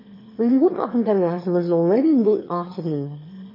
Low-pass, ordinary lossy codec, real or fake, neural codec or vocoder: 5.4 kHz; MP3, 24 kbps; fake; autoencoder, 22.05 kHz, a latent of 192 numbers a frame, VITS, trained on one speaker